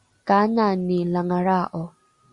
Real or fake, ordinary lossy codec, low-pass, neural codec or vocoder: real; Opus, 64 kbps; 10.8 kHz; none